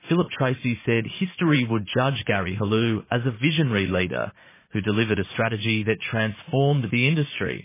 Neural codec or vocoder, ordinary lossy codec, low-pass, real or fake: vocoder, 44.1 kHz, 80 mel bands, Vocos; MP3, 16 kbps; 3.6 kHz; fake